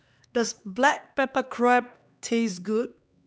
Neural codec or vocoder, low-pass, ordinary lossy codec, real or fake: codec, 16 kHz, 2 kbps, X-Codec, HuBERT features, trained on LibriSpeech; none; none; fake